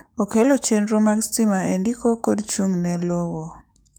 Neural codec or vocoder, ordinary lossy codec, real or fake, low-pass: codec, 44.1 kHz, 7.8 kbps, DAC; none; fake; none